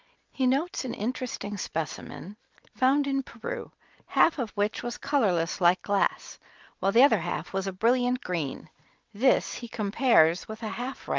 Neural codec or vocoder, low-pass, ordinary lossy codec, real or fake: none; 7.2 kHz; Opus, 32 kbps; real